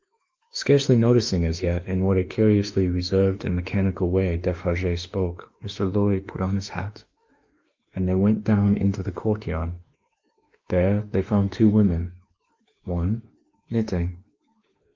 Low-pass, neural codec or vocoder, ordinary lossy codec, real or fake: 7.2 kHz; autoencoder, 48 kHz, 32 numbers a frame, DAC-VAE, trained on Japanese speech; Opus, 24 kbps; fake